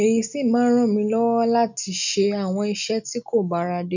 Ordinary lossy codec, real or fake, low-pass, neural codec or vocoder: none; real; 7.2 kHz; none